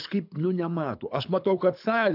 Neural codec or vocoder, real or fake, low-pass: codec, 24 kHz, 6 kbps, HILCodec; fake; 5.4 kHz